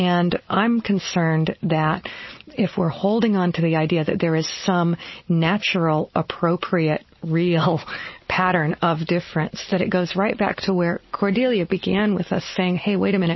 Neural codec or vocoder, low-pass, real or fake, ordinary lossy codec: none; 7.2 kHz; real; MP3, 24 kbps